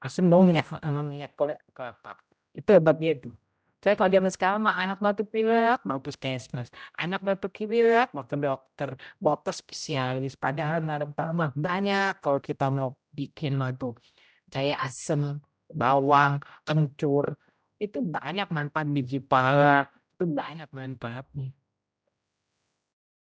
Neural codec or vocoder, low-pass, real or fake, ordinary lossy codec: codec, 16 kHz, 0.5 kbps, X-Codec, HuBERT features, trained on general audio; none; fake; none